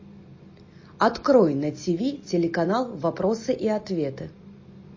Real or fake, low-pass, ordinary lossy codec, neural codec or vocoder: real; 7.2 kHz; MP3, 32 kbps; none